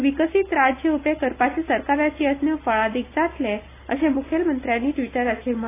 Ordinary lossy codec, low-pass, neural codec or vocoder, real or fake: AAC, 24 kbps; 3.6 kHz; none; real